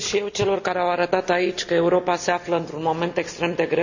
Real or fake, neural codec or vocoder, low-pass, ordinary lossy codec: real; none; 7.2 kHz; none